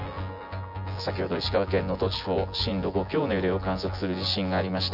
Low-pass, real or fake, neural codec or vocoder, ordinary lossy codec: 5.4 kHz; fake; vocoder, 24 kHz, 100 mel bands, Vocos; none